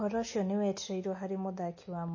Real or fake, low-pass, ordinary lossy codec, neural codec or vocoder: real; 7.2 kHz; MP3, 32 kbps; none